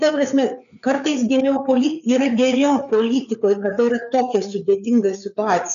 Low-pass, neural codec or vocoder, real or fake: 7.2 kHz; codec, 16 kHz, 4 kbps, FreqCodec, larger model; fake